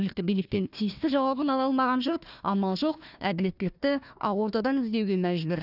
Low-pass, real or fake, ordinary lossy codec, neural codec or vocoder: 5.4 kHz; fake; none; codec, 16 kHz, 1 kbps, FunCodec, trained on Chinese and English, 50 frames a second